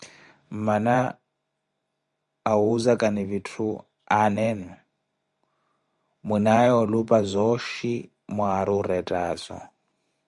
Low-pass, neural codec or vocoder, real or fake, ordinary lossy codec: 10.8 kHz; vocoder, 44.1 kHz, 128 mel bands every 512 samples, BigVGAN v2; fake; Opus, 64 kbps